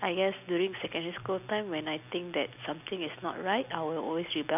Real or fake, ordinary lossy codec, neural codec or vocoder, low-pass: real; none; none; 3.6 kHz